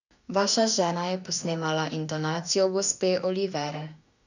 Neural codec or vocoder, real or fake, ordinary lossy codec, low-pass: autoencoder, 48 kHz, 32 numbers a frame, DAC-VAE, trained on Japanese speech; fake; none; 7.2 kHz